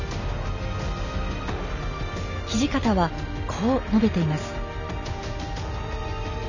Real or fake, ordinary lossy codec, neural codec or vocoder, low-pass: real; none; none; 7.2 kHz